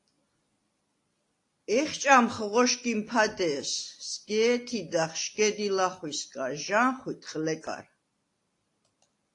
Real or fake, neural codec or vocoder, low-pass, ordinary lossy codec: real; none; 10.8 kHz; AAC, 48 kbps